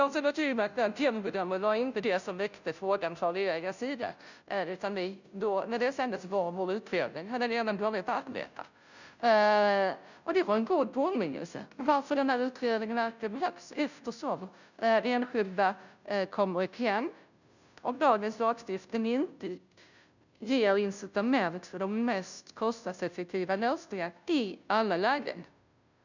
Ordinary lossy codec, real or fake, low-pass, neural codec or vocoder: none; fake; 7.2 kHz; codec, 16 kHz, 0.5 kbps, FunCodec, trained on Chinese and English, 25 frames a second